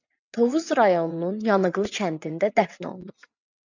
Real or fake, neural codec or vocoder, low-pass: fake; vocoder, 22.05 kHz, 80 mel bands, WaveNeXt; 7.2 kHz